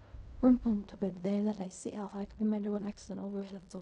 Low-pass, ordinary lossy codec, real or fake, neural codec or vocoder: 10.8 kHz; none; fake; codec, 16 kHz in and 24 kHz out, 0.4 kbps, LongCat-Audio-Codec, fine tuned four codebook decoder